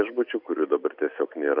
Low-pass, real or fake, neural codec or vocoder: 5.4 kHz; real; none